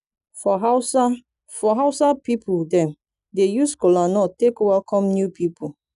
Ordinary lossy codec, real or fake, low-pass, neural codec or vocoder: none; real; 10.8 kHz; none